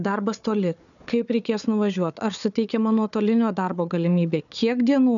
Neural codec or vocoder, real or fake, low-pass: codec, 16 kHz, 4 kbps, FunCodec, trained on Chinese and English, 50 frames a second; fake; 7.2 kHz